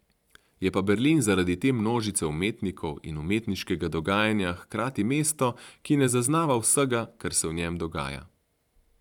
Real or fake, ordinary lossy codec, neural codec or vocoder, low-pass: fake; none; vocoder, 44.1 kHz, 128 mel bands every 512 samples, BigVGAN v2; 19.8 kHz